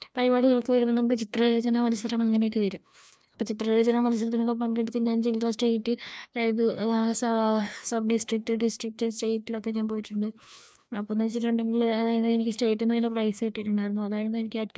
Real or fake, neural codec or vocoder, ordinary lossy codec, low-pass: fake; codec, 16 kHz, 1 kbps, FreqCodec, larger model; none; none